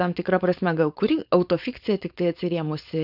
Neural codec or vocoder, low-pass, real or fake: codec, 16 kHz, 4.8 kbps, FACodec; 5.4 kHz; fake